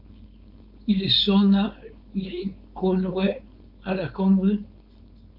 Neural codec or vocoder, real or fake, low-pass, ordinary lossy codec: codec, 16 kHz, 4.8 kbps, FACodec; fake; 5.4 kHz; MP3, 48 kbps